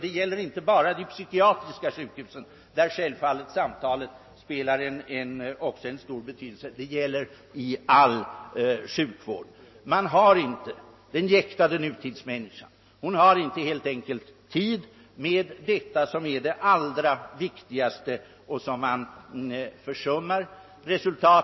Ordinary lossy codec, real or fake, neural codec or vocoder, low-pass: MP3, 24 kbps; real; none; 7.2 kHz